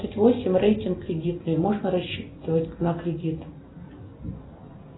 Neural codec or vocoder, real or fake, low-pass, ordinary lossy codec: none; real; 7.2 kHz; AAC, 16 kbps